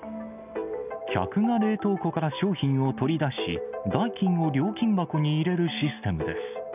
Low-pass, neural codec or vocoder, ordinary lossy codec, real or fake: 3.6 kHz; none; none; real